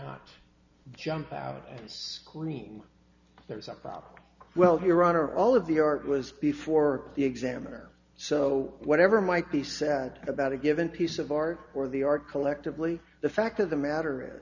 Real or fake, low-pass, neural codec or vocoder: real; 7.2 kHz; none